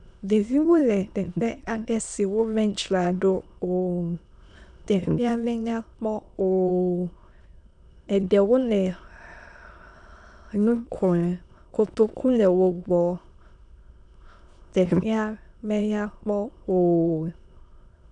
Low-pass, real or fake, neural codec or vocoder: 9.9 kHz; fake; autoencoder, 22.05 kHz, a latent of 192 numbers a frame, VITS, trained on many speakers